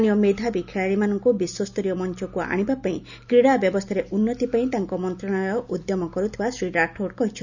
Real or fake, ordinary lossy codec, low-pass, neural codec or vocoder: real; none; 7.2 kHz; none